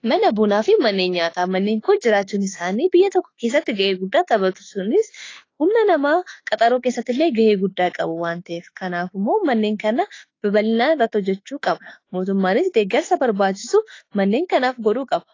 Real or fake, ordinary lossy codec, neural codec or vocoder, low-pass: fake; AAC, 32 kbps; autoencoder, 48 kHz, 32 numbers a frame, DAC-VAE, trained on Japanese speech; 7.2 kHz